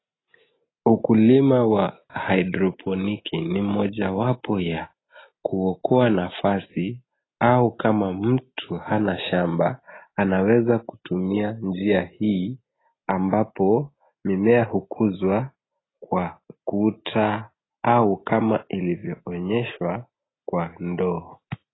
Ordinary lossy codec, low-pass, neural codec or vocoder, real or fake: AAC, 16 kbps; 7.2 kHz; none; real